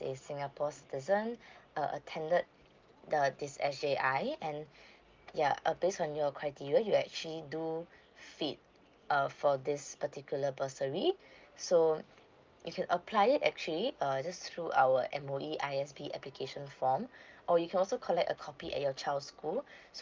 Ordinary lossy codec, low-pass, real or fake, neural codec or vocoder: Opus, 24 kbps; 7.2 kHz; real; none